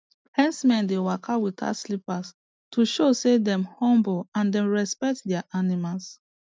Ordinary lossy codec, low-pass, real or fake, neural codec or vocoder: none; none; real; none